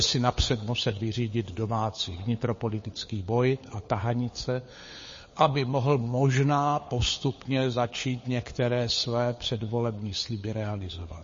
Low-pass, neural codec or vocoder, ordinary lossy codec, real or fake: 7.2 kHz; codec, 16 kHz, 4 kbps, FunCodec, trained on LibriTTS, 50 frames a second; MP3, 32 kbps; fake